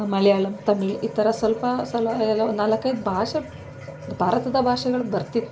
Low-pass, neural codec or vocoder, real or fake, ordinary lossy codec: none; none; real; none